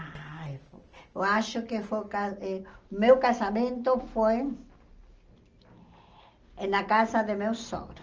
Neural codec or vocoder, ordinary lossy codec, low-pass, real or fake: none; Opus, 24 kbps; 7.2 kHz; real